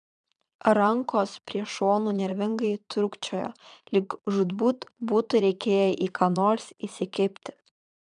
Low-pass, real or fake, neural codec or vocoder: 9.9 kHz; fake; vocoder, 22.05 kHz, 80 mel bands, Vocos